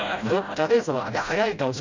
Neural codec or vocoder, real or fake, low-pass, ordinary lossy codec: codec, 16 kHz, 0.5 kbps, FreqCodec, smaller model; fake; 7.2 kHz; MP3, 64 kbps